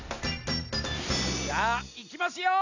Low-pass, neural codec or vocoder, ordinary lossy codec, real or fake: 7.2 kHz; none; none; real